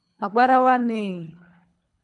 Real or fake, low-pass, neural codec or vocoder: fake; 10.8 kHz; codec, 24 kHz, 3 kbps, HILCodec